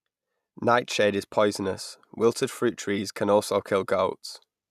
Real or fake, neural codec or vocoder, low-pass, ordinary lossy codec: real; none; 14.4 kHz; none